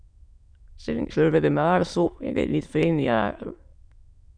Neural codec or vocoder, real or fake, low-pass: autoencoder, 22.05 kHz, a latent of 192 numbers a frame, VITS, trained on many speakers; fake; 9.9 kHz